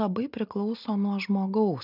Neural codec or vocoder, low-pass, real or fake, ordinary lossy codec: none; 5.4 kHz; real; AAC, 48 kbps